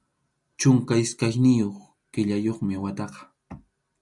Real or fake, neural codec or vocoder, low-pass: real; none; 10.8 kHz